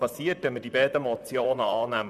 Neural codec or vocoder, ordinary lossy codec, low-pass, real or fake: vocoder, 44.1 kHz, 128 mel bands, Pupu-Vocoder; MP3, 96 kbps; 14.4 kHz; fake